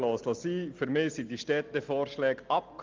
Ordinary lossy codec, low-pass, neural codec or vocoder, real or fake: Opus, 32 kbps; 7.2 kHz; none; real